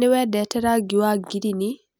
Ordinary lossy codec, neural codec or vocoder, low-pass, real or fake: none; none; none; real